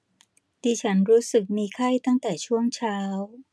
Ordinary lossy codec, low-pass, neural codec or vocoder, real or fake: none; none; none; real